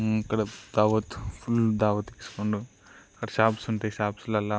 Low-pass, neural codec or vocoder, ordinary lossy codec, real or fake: none; none; none; real